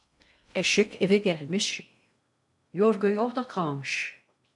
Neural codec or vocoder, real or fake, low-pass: codec, 16 kHz in and 24 kHz out, 0.6 kbps, FocalCodec, streaming, 2048 codes; fake; 10.8 kHz